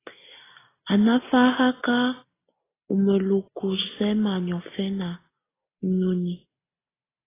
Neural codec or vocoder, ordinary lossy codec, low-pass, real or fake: none; AAC, 16 kbps; 3.6 kHz; real